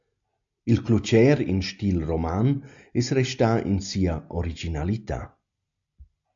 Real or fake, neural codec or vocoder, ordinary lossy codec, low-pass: real; none; AAC, 64 kbps; 7.2 kHz